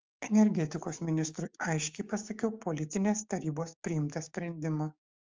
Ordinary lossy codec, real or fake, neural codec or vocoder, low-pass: Opus, 32 kbps; fake; codec, 16 kHz, 6 kbps, DAC; 7.2 kHz